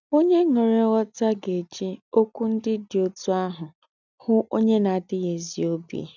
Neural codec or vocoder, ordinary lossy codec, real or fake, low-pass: none; none; real; 7.2 kHz